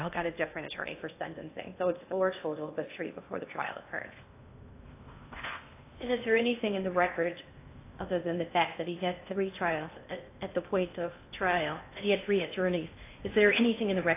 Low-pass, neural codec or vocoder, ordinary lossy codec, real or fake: 3.6 kHz; codec, 16 kHz in and 24 kHz out, 0.8 kbps, FocalCodec, streaming, 65536 codes; AAC, 24 kbps; fake